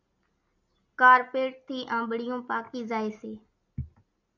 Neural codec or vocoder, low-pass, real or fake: none; 7.2 kHz; real